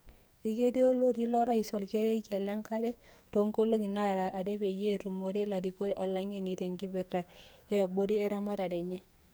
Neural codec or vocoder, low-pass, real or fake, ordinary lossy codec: codec, 44.1 kHz, 2.6 kbps, SNAC; none; fake; none